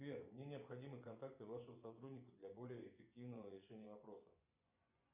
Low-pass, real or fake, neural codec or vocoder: 3.6 kHz; fake; vocoder, 24 kHz, 100 mel bands, Vocos